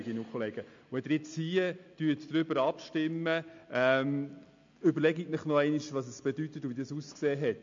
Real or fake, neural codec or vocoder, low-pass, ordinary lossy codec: real; none; 7.2 kHz; MP3, 48 kbps